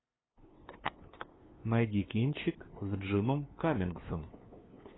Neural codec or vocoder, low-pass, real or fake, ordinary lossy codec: codec, 16 kHz, 2 kbps, FunCodec, trained on LibriTTS, 25 frames a second; 7.2 kHz; fake; AAC, 16 kbps